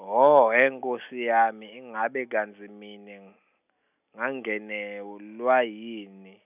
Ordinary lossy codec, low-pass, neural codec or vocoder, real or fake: none; 3.6 kHz; none; real